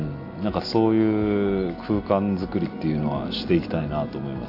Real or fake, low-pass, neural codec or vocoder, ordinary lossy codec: real; 5.4 kHz; none; AAC, 32 kbps